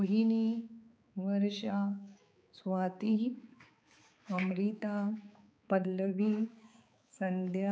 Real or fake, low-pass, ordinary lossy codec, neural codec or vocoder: fake; none; none; codec, 16 kHz, 4 kbps, X-Codec, HuBERT features, trained on balanced general audio